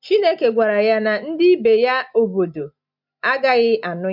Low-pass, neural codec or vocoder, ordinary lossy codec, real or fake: 5.4 kHz; none; MP3, 48 kbps; real